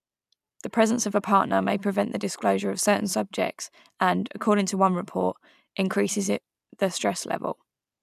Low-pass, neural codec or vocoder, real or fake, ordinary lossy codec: 14.4 kHz; none; real; none